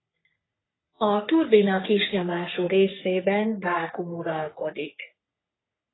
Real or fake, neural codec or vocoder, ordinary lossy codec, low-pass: fake; codec, 44.1 kHz, 3.4 kbps, Pupu-Codec; AAC, 16 kbps; 7.2 kHz